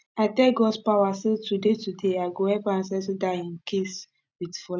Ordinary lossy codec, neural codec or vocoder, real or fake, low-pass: none; none; real; none